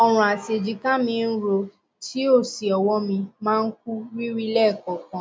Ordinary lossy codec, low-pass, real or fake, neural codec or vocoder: none; none; real; none